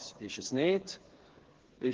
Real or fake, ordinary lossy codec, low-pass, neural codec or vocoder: fake; Opus, 16 kbps; 7.2 kHz; codec, 16 kHz, 4 kbps, FreqCodec, smaller model